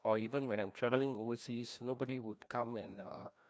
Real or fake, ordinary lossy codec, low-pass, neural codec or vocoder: fake; none; none; codec, 16 kHz, 1 kbps, FreqCodec, larger model